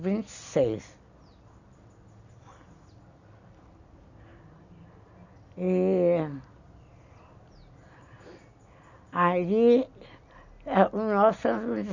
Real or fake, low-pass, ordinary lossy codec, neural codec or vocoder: real; 7.2 kHz; none; none